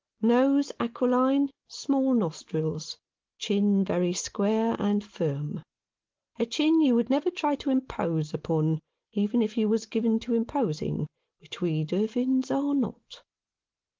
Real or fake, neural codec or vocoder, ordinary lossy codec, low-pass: real; none; Opus, 16 kbps; 7.2 kHz